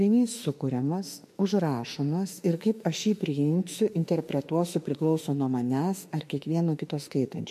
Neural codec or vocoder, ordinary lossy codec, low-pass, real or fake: autoencoder, 48 kHz, 32 numbers a frame, DAC-VAE, trained on Japanese speech; MP3, 64 kbps; 14.4 kHz; fake